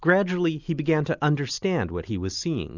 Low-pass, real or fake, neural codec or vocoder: 7.2 kHz; real; none